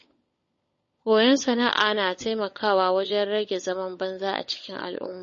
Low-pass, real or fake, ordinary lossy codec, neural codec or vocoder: 7.2 kHz; fake; MP3, 32 kbps; codec, 16 kHz, 16 kbps, FunCodec, trained on LibriTTS, 50 frames a second